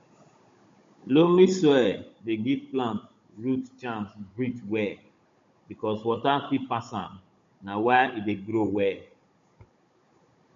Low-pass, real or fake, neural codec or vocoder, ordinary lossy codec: 7.2 kHz; fake; codec, 16 kHz, 16 kbps, FunCodec, trained on Chinese and English, 50 frames a second; MP3, 48 kbps